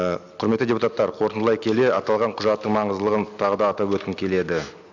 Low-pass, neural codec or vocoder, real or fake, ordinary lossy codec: 7.2 kHz; none; real; none